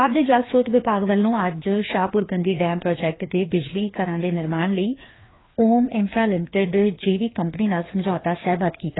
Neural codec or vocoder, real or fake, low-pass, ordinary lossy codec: codec, 16 kHz, 2 kbps, FreqCodec, larger model; fake; 7.2 kHz; AAC, 16 kbps